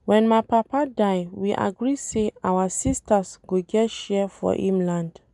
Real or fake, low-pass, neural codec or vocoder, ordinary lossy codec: real; 10.8 kHz; none; none